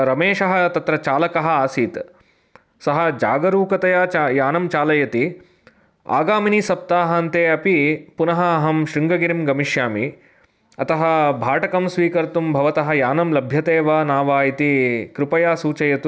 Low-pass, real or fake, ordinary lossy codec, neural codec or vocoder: none; real; none; none